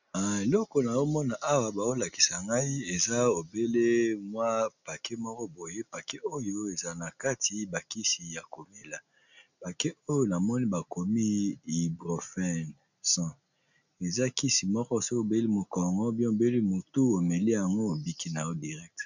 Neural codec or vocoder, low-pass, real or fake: none; 7.2 kHz; real